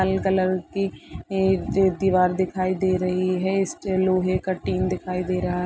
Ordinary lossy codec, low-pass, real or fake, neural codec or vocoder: none; none; real; none